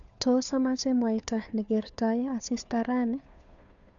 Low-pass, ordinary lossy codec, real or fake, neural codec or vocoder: 7.2 kHz; none; fake; codec, 16 kHz, 2 kbps, FunCodec, trained on Chinese and English, 25 frames a second